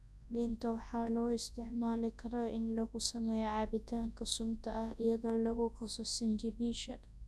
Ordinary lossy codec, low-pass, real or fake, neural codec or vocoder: none; none; fake; codec, 24 kHz, 0.9 kbps, WavTokenizer, large speech release